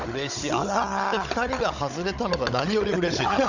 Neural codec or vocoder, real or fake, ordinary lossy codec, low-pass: codec, 16 kHz, 16 kbps, FunCodec, trained on Chinese and English, 50 frames a second; fake; none; 7.2 kHz